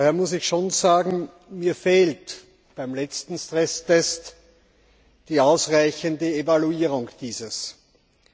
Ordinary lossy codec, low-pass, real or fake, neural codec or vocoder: none; none; real; none